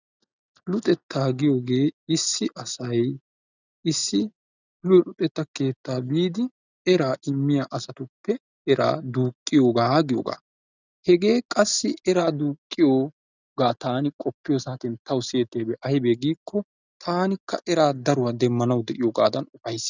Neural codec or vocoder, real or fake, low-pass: none; real; 7.2 kHz